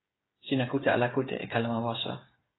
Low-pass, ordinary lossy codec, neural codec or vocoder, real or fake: 7.2 kHz; AAC, 16 kbps; codec, 16 kHz, 8 kbps, FreqCodec, smaller model; fake